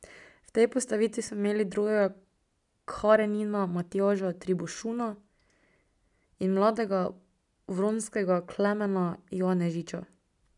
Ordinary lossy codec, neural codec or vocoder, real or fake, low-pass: none; none; real; 10.8 kHz